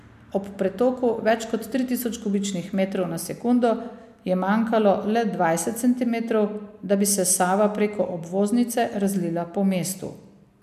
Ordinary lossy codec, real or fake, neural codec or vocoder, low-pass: AAC, 96 kbps; real; none; 14.4 kHz